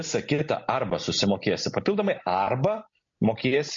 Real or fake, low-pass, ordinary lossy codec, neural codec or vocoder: real; 7.2 kHz; MP3, 48 kbps; none